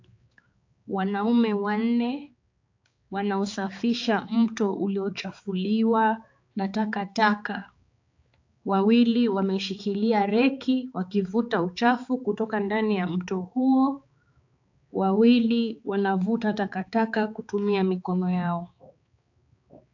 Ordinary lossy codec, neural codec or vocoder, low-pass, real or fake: AAC, 48 kbps; codec, 16 kHz, 4 kbps, X-Codec, HuBERT features, trained on balanced general audio; 7.2 kHz; fake